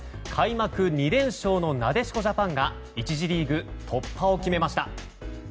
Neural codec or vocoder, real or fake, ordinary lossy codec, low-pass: none; real; none; none